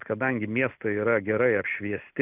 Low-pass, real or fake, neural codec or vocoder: 3.6 kHz; real; none